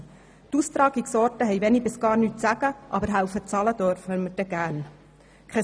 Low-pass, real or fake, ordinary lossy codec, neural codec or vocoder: none; real; none; none